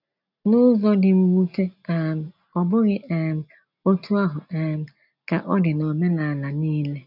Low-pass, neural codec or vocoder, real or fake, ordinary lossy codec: 5.4 kHz; none; real; none